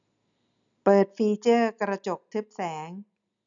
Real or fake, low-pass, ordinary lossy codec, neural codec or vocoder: real; 7.2 kHz; none; none